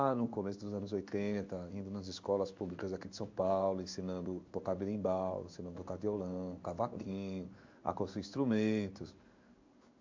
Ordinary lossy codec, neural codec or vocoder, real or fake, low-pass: MP3, 48 kbps; codec, 16 kHz in and 24 kHz out, 1 kbps, XY-Tokenizer; fake; 7.2 kHz